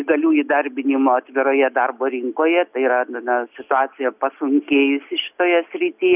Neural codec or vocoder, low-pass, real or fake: none; 3.6 kHz; real